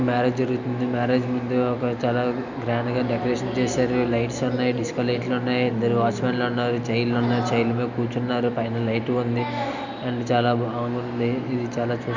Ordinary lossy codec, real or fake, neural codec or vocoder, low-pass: none; real; none; 7.2 kHz